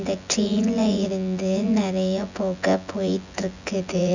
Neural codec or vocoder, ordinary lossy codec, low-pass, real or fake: vocoder, 24 kHz, 100 mel bands, Vocos; none; 7.2 kHz; fake